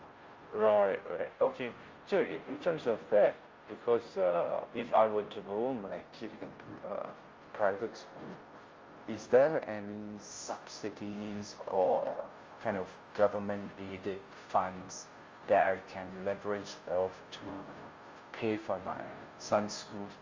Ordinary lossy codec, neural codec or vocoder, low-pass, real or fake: Opus, 32 kbps; codec, 16 kHz, 0.5 kbps, FunCodec, trained on Chinese and English, 25 frames a second; 7.2 kHz; fake